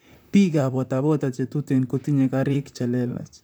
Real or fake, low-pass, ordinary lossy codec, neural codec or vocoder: fake; none; none; vocoder, 44.1 kHz, 128 mel bands, Pupu-Vocoder